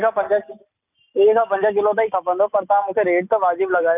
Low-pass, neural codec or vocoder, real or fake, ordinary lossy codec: 3.6 kHz; none; real; none